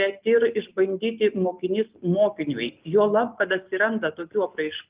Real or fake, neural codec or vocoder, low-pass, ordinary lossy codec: real; none; 3.6 kHz; Opus, 32 kbps